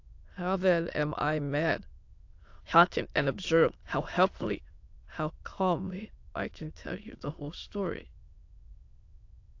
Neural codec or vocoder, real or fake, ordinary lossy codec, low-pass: autoencoder, 22.05 kHz, a latent of 192 numbers a frame, VITS, trained on many speakers; fake; AAC, 48 kbps; 7.2 kHz